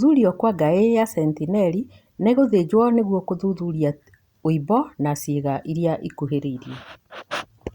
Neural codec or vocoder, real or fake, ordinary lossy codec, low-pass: none; real; Opus, 64 kbps; 19.8 kHz